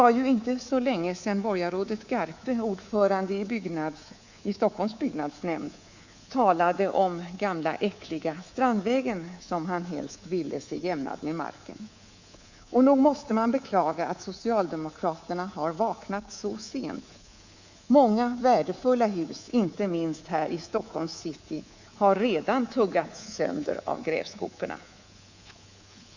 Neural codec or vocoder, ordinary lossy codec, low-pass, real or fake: codec, 24 kHz, 3.1 kbps, DualCodec; Opus, 64 kbps; 7.2 kHz; fake